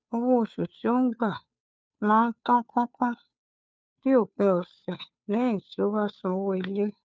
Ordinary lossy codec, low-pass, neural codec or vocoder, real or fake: none; none; codec, 16 kHz, 2 kbps, FunCodec, trained on Chinese and English, 25 frames a second; fake